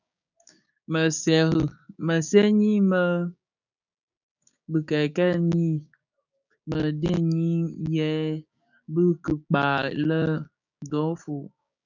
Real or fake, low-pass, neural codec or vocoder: fake; 7.2 kHz; codec, 16 kHz, 6 kbps, DAC